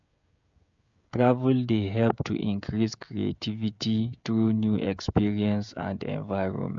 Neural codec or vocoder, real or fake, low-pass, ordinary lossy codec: codec, 16 kHz, 16 kbps, FreqCodec, smaller model; fake; 7.2 kHz; MP3, 64 kbps